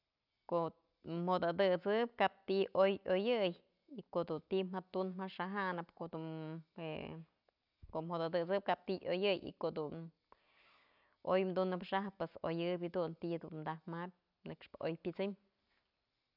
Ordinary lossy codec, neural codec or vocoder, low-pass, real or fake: none; none; 5.4 kHz; real